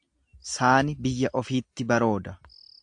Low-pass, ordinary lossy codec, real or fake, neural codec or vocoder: 9.9 kHz; AAC, 64 kbps; real; none